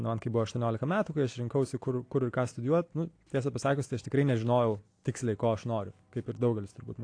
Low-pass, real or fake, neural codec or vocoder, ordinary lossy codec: 9.9 kHz; real; none; AAC, 48 kbps